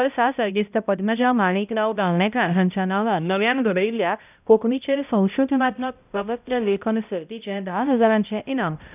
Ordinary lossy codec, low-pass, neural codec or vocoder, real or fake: none; 3.6 kHz; codec, 16 kHz, 0.5 kbps, X-Codec, HuBERT features, trained on balanced general audio; fake